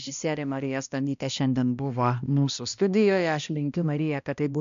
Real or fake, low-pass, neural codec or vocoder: fake; 7.2 kHz; codec, 16 kHz, 1 kbps, X-Codec, HuBERT features, trained on balanced general audio